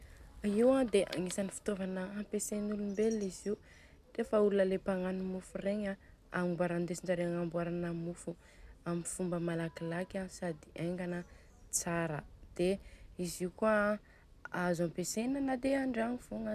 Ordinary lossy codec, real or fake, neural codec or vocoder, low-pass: none; real; none; 14.4 kHz